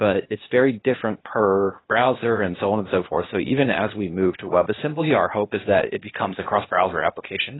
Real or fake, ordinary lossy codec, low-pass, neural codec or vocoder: fake; AAC, 16 kbps; 7.2 kHz; codec, 16 kHz, 0.8 kbps, ZipCodec